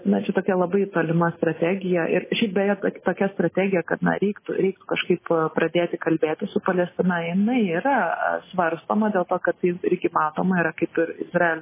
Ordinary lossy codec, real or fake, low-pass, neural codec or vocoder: MP3, 16 kbps; real; 3.6 kHz; none